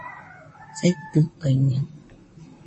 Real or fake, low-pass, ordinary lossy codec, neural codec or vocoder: fake; 10.8 kHz; MP3, 32 kbps; vocoder, 44.1 kHz, 128 mel bands, Pupu-Vocoder